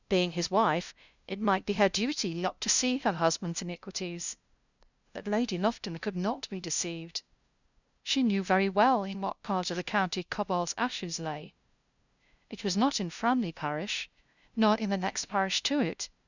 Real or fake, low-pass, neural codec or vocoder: fake; 7.2 kHz; codec, 16 kHz, 0.5 kbps, FunCodec, trained on LibriTTS, 25 frames a second